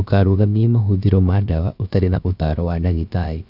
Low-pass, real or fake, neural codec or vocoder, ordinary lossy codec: 5.4 kHz; fake; codec, 16 kHz, about 1 kbps, DyCAST, with the encoder's durations; none